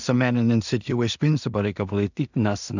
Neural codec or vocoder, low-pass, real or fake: codec, 16 kHz in and 24 kHz out, 0.4 kbps, LongCat-Audio-Codec, two codebook decoder; 7.2 kHz; fake